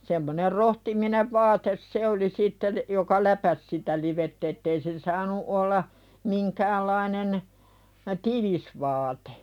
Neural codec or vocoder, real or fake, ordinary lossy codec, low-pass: none; real; none; 19.8 kHz